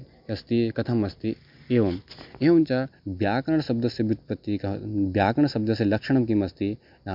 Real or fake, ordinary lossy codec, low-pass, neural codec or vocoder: real; MP3, 48 kbps; 5.4 kHz; none